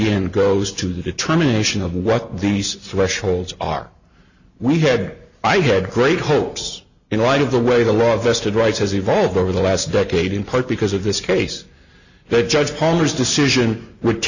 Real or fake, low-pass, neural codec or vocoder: real; 7.2 kHz; none